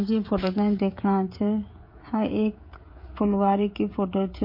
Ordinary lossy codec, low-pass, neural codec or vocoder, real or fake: MP3, 32 kbps; 5.4 kHz; codec, 16 kHz, 16 kbps, FreqCodec, smaller model; fake